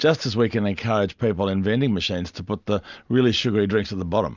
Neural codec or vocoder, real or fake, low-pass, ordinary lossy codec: none; real; 7.2 kHz; Opus, 64 kbps